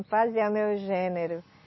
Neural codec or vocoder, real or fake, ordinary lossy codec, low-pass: none; real; MP3, 24 kbps; 7.2 kHz